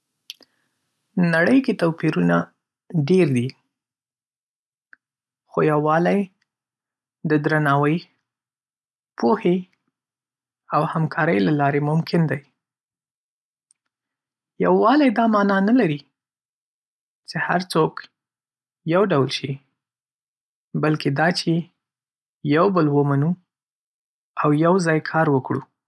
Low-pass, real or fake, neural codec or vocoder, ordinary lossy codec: none; real; none; none